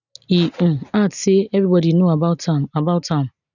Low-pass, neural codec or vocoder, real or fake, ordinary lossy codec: 7.2 kHz; none; real; none